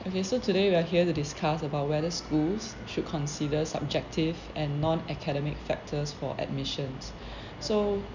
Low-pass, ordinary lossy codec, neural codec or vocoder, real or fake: 7.2 kHz; none; none; real